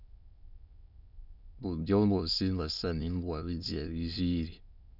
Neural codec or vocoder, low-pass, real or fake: autoencoder, 22.05 kHz, a latent of 192 numbers a frame, VITS, trained on many speakers; 5.4 kHz; fake